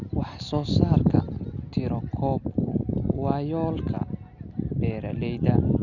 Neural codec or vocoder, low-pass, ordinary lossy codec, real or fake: none; 7.2 kHz; none; real